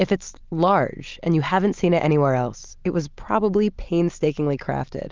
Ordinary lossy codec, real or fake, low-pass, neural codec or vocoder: Opus, 32 kbps; real; 7.2 kHz; none